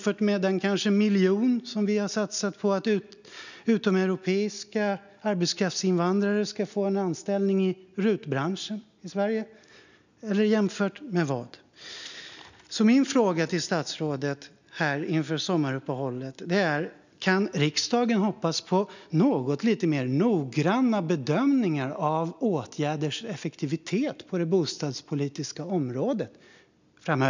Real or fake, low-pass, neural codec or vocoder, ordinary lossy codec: real; 7.2 kHz; none; none